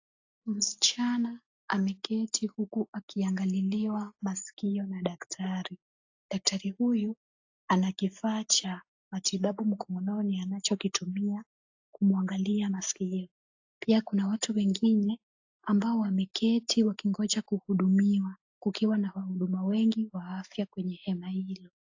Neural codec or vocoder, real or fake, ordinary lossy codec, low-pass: none; real; AAC, 48 kbps; 7.2 kHz